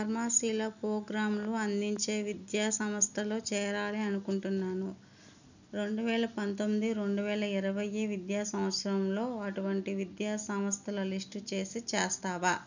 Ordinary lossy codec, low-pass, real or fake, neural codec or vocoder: none; 7.2 kHz; real; none